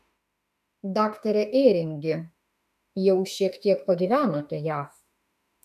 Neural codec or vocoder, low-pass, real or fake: autoencoder, 48 kHz, 32 numbers a frame, DAC-VAE, trained on Japanese speech; 14.4 kHz; fake